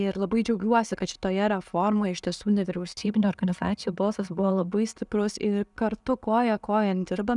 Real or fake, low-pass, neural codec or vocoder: real; 10.8 kHz; none